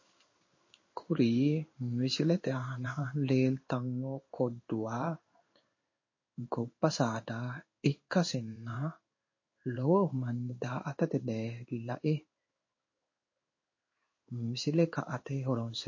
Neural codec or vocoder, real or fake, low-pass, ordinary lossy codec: codec, 16 kHz in and 24 kHz out, 1 kbps, XY-Tokenizer; fake; 7.2 kHz; MP3, 32 kbps